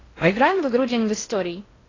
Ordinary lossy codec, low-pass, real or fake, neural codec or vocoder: AAC, 32 kbps; 7.2 kHz; fake; codec, 16 kHz in and 24 kHz out, 0.6 kbps, FocalCodec, streaming, 4096 codes